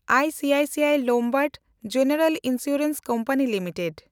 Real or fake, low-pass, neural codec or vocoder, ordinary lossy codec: real; none; none; none